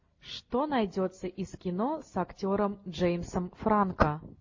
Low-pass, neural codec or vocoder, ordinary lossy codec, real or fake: 7.2 kHz; none; MP3, 32 kbps; real